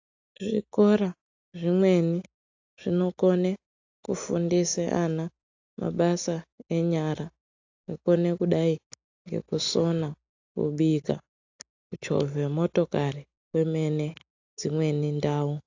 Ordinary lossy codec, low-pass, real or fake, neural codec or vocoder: AAC, 48 kbps; 7.2 kHz; real; none